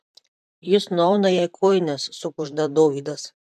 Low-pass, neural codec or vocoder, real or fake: 14.4 kHz; vocoder, 44.1 kHz, 128 mel bands, Pupu-Vocoder; fake